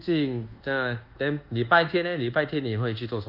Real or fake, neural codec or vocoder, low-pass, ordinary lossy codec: fake; codec, 24 kHz, 1.2 kbps, DualCodec; 5.4 kHz; Opus, 24 kbps